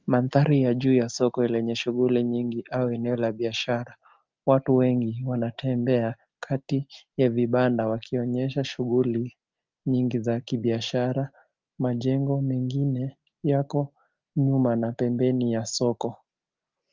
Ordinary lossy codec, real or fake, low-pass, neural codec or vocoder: Opus, 32 kbps; real; 7.2 kHz; none